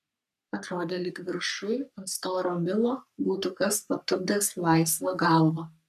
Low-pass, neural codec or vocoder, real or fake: 14.4 kHz; codec, 44.1 kHz, 3.4 kbps, Pupu-Codec; fake